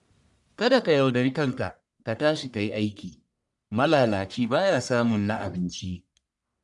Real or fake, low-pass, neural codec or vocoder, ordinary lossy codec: fake; 10.8 kHz; codec, 44.1 kHz, 1.7 kbps, Pupu-Codec; none